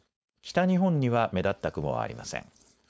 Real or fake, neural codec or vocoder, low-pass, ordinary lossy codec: fake; codec, 16 kHz, 4.8 kbps, FACodec; none; none